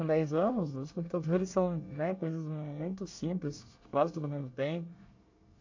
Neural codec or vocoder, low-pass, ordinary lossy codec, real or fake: codec, 24 kHz, 1 kbps, SNAC; 7.2 kHz; AAC, 48 kbps; fake